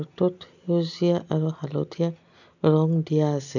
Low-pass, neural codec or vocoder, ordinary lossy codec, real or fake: 7.2 kHz; none; none; real